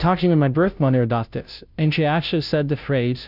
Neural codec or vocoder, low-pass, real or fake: codec, 16 kHz, 0.5 kbps, FunCodec, trained on Chinese and English, 25 frames a second; 5.4 kHz; fake